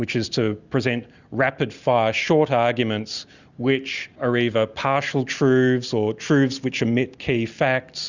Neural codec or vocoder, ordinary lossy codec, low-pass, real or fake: none; Opus, 64 kbps; 7.2 kHz; real